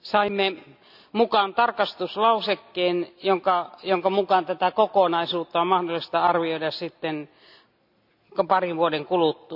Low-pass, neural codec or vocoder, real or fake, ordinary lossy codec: 5.4 kHz; none; real; none